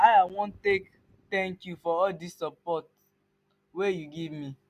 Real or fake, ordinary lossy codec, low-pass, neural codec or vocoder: real; none; 14.4 kHz; none